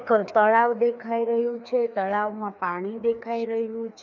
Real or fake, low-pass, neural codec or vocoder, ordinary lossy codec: fake; 7.2 kHz; codec, 16 kHz, 2 kbps, FreqCodec, larger model; none